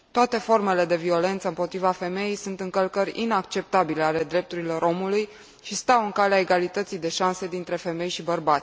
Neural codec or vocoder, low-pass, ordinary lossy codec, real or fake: none; none; none; real